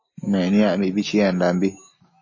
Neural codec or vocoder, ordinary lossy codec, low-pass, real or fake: none; MP3, 32 kbps; 7.2 kHz; real